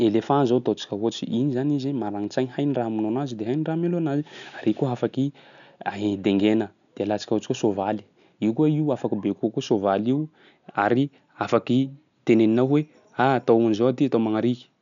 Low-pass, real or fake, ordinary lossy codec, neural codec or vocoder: 7.2 kHz; real; none; none